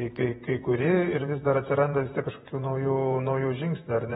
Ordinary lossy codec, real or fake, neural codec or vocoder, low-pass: AAC, 16 kbps; fake; vocoder, 48 kHz, 128 mel bands, Vocos; 19.8 kHz